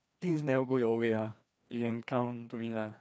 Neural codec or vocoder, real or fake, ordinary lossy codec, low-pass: codec, 16 kHz, 2 kbps, FreqCodec, larger model; fake; none; none